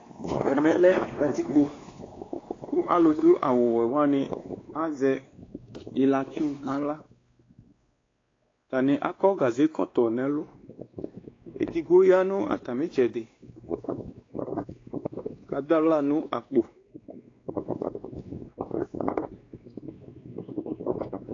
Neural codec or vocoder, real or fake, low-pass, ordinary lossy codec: codec, 16 kHz, 2 kbps, X-Codec, WavLM features, trained on Multilingual LibriSpeech; fake; 7.2 kHz; AAC, 32 kbps